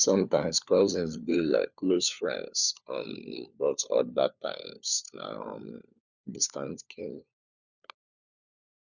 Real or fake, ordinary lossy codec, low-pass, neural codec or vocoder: fake; none; 7.2 kHz; codec, 16 kHz, 4 kbps, FunCodec, trained on LibriTTS, 50 frames a second